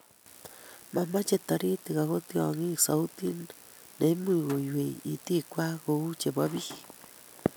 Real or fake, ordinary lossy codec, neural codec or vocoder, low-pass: real; none; none; none